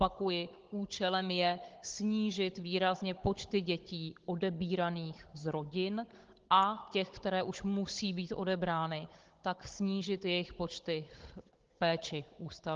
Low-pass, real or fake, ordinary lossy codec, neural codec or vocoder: 7.2 kHz; fake; Opus, 16 kbps; codec, 16 kHz, 16 kbps, FunCodec, trained on Chinese and English, 50 frames a second